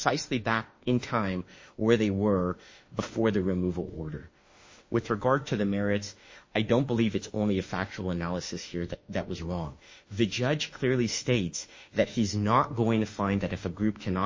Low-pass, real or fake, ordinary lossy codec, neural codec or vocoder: 7.2 kHz; fake; MP3, 32 kbps; autoencoder, 48 kHz, 32 numbers a frame, DAC-VAE, trained on Japanese speech